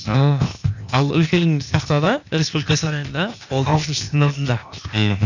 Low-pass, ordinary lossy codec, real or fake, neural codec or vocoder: 7.2 kHz; none; fake; codec, 16 kHz, 0.8 kbps, ZipCodec